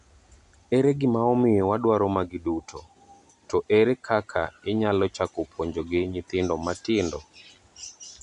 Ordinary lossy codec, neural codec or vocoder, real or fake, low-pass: MP3, 96 kbps; none; real; 10.8 kHz